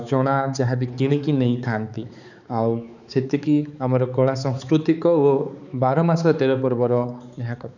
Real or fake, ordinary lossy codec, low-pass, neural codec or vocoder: fake; none; 7.2 kHz; codec, 16 kHz, 4 kbps, X-Codec, HuBERT features, trained on balanced general audio